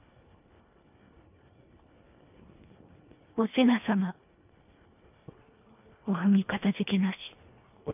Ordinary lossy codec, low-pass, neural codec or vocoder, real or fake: none; 3.6 kHz; codec, 24 kHz, 1.5 kbps, HILCodec; fake